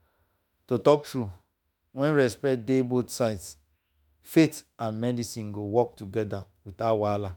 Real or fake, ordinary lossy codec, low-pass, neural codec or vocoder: fake; none; none; autoencoder, 48 kHz, 32 numbers a frame, DAC-VAE, trained on Japanese speech